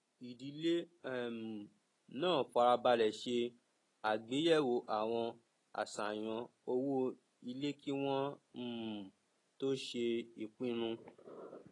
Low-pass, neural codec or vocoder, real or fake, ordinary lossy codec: 10.8 kHz; none; real; AAC, 32 kbps